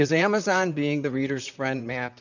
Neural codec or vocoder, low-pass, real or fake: vocoder, 44.1 kHz, 128 mel bands, Pupu-Vocoder; 7.2 kHz; fake